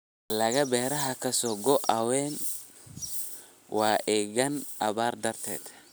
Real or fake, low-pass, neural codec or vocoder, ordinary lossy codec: real; none; none; none